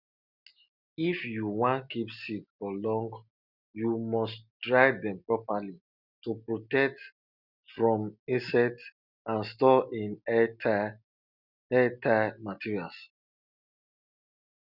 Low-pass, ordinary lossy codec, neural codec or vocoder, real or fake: 5.4 kHz; none; none; real